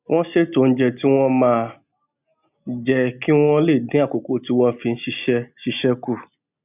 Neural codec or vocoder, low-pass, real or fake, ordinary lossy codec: none; 3.6 kHz; real; none